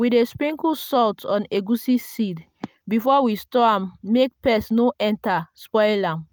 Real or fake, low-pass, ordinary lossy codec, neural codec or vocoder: real; none; none; none